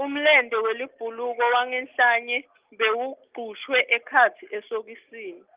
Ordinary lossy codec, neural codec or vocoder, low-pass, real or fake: Opus, 24 kbps; none; 3.6 kHz; real